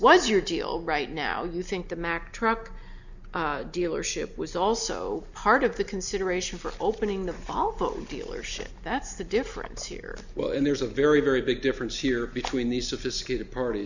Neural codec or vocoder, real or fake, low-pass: none; real; 7.2 kHz